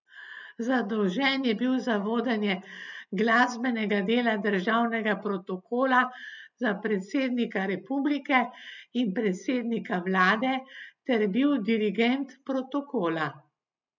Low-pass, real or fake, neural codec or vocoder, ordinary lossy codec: 7.2 kHz; real; none; none